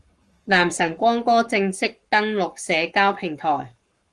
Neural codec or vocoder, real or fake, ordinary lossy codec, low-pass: codec, 44.1 kHz, 7.8 kbps, Pupu-Codec; fake; Opus, 24 kbps; 10.8 kHz